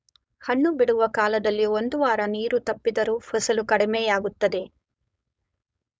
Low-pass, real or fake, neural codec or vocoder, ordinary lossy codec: none; fake; codec, 16 kHz, 4.8 kbps, FACodec; none